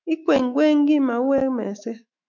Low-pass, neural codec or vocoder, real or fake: 7.2 kHz; autoencoder, 48 kHz, 128 numbers a frame, DAC-VAE, trained on Japanese speech; fake